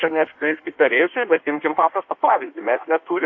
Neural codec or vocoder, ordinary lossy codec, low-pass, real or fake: codec, 16 kHz in and 24 kHz out, 1.1 kbps, FireRedTTS-2 codec; AAC, 48 kbps; 7.2 kHz; fake